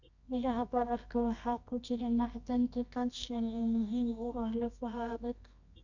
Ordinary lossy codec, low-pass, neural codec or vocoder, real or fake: none; 7.2 kHz; codec, 24 kHz, 0.9 kbps, WavTokenizer, medium music audio release; fake